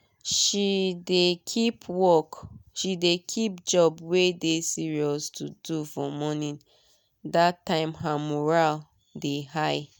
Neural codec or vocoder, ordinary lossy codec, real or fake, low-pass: none; none; real; none